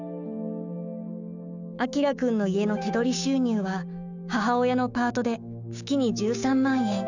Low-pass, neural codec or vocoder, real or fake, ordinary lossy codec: 7.2 kHz; codec, 16 kHz, 6 kbps, DAC; fake; none